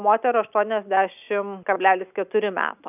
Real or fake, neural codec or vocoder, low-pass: real; none; 3.6 kHz